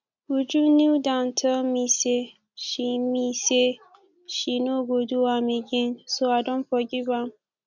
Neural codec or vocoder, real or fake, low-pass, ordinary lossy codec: none; real; 7.2 kHz; none